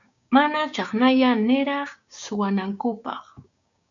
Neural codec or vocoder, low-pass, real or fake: codec, 16 kHz, 6 kbps, DAC; 7.2 kHz; fake